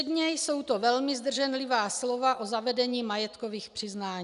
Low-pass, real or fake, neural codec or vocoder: 10.8 kHz; real; none